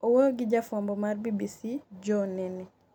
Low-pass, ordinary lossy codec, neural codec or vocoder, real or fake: 19.8 kHz; none; none; real